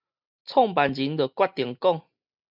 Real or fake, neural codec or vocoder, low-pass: real; none; 5.4 kHz